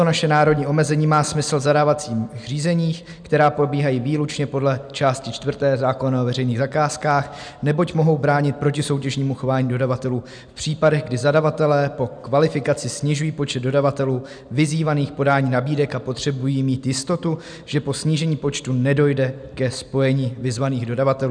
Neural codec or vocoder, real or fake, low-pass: none; real; 9.9 kHz